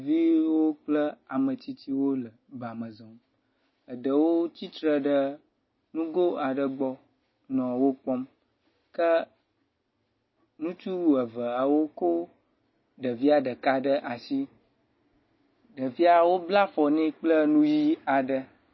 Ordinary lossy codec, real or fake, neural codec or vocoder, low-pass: MP3, 24 kbps; real; none; 7.2 kHz